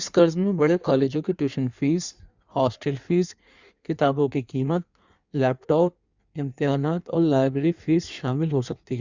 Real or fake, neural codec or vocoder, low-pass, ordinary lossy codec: fake; codec, 16 kHz in and 24 kHz out, 1.1 kbps, FireRedTTS-2 codec; 7.2 kHz; Opus, 64 kbps